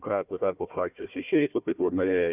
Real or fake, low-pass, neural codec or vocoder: fake; 3.6 kHz; codec, 16 kHz, 1 kbps, FunCodec, trained on Chinese and English, 50 frames a second